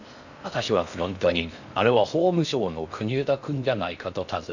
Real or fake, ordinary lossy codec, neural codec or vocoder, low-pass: fake; none; codec, 16 kHz in and 24 kHz out, 0.6 kbps, FocalCodec, streaming, 4096 codes; 7.2 kHz